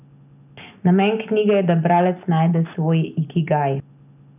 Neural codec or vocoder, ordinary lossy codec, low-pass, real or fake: none; none; 3.6 kHz; real